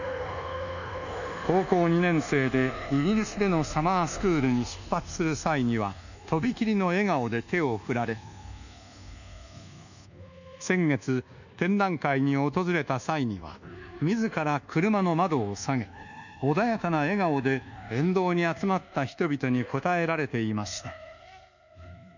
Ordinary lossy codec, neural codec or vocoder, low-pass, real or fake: none; codec, 24 kHz, 1.2 kbps, DualCodec; 7.2 kHz; fake